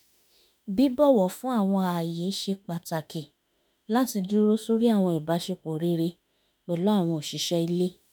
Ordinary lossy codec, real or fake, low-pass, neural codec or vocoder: none; fake; none; autoencoder, 48 kHz, 32 numbers a frame, DAC-VAE, trained on Japanese speech